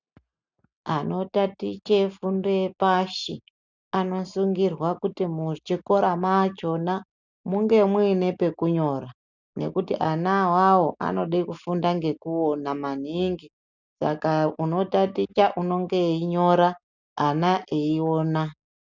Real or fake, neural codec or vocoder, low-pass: real; none; 7.2 kHz